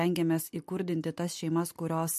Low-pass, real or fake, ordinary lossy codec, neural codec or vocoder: 14.4 kHz; real; MP3, 64 kbps; none